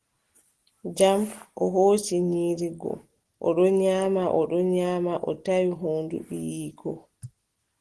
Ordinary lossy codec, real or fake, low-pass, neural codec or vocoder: Opus, 16 kbps; real; 10.8 kHz; none